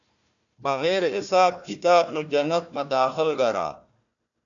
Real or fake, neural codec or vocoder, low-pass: fake; codec, 16 kHz, 1 kbps, FunCodec, trained on Chinese and English, 50 frames a second; 7.2 kHz